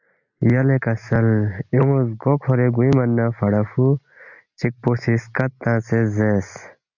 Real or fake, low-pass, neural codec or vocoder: real; 7.2 kHz; none